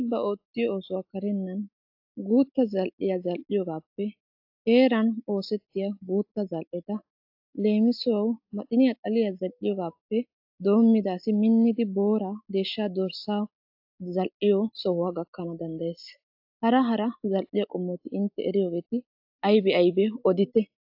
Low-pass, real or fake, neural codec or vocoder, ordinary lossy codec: 5.4 kHz; real; none; AAC, 48 kbps